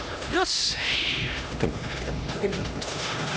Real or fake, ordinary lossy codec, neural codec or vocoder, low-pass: fake; none; codec, 16 kHz, 1 kbps, X-Codec, HuBERT features, trained on LibriSpeech; none